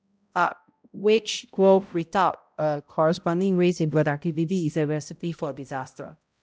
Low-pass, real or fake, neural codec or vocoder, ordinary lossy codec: none; fake; codec, 16 kHz, 0.5 kbps, X-Codec, HuBERT features, trained on balanced general audio; none